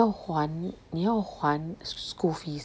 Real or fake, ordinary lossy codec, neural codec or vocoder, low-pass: real; none; none; none